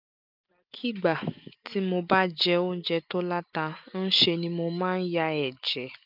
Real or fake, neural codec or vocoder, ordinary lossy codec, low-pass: real; none; none; 5.4 kHz